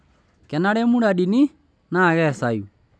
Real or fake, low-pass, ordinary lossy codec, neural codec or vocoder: real; none; none; none